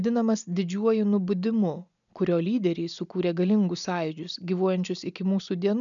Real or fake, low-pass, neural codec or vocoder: real; 7.2 kHz; none